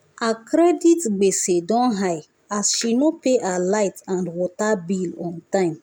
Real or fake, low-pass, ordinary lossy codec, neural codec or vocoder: real; 19.8 kHz; none; none